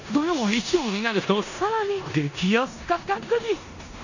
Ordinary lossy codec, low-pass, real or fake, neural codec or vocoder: none; 7.2 kHz; fake; codec, 16 kHz in and 24 kHz out, 0.9 kbps, LongCat-Audio-Codec, four codebook decoder